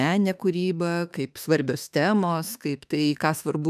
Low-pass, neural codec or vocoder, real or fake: 14.4 kHz; autoencoder, 48 kHz, 32 numbers a frame, DAC-VAE, trained on Japanese speech; fake